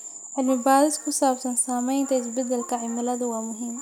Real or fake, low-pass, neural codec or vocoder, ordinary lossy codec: real; none; none; none